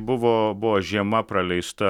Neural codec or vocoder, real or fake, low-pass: none; real; 19.8 kHz